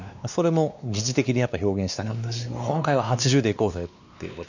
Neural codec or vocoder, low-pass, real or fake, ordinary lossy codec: codec, 16 kHz, 2 kbps, X-Codec, WavLM features, trained on Multilingual LibriSpeech; 7.2 kHz; fake; none